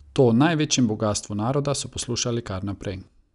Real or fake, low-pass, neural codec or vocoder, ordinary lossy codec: real; 10.8 kHz; none; none